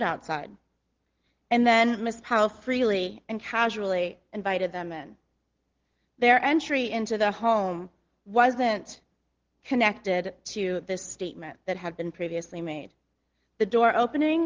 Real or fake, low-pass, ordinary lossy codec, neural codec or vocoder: real; 7.2 kHz; Opus, 16 kbps; none